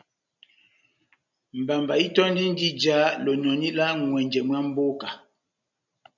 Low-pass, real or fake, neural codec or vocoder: 7.2 kHz; real; none